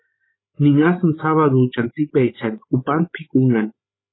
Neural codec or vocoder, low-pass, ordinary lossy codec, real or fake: codec, 16 kHz, 16 kbps, FreqCodec, larger model; 7.2 kHz; AAC, 16 kbps; fake